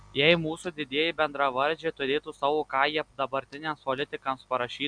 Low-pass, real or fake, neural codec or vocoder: 9.9 kHz; real; none